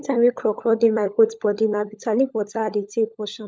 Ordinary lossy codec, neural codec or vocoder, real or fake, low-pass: none; codec, 16 kHz, 16 kbps, FunCodec, trained on LibriTTS, 50 frames a second; fake; none